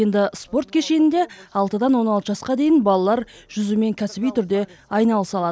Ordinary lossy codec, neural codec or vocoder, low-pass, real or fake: none; none; none; real